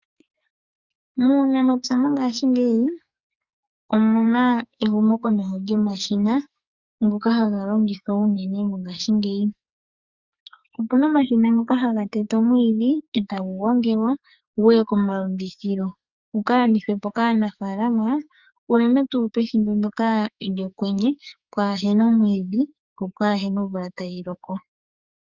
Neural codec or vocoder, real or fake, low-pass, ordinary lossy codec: codec, 44.1 kHz, 2.6 kbps, SNAC; fake; 7.2 kHz; Opus, 64 kbps